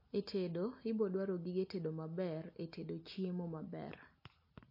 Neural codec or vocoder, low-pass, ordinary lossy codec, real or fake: none; 5.4 kHz; MP3, 32 kbps; real